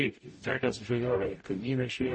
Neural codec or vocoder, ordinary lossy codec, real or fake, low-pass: codec, 44.1 kHz, 0.9 kbps, DAC; MP3, 32 kbps; fake; 9.9 kHz